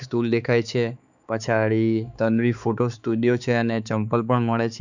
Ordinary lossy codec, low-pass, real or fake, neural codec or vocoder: none; 7.2 kHz; fake; codec, 16 kHz, 4 kbps, X-Codec, HuBERT features, trained on balanced general audio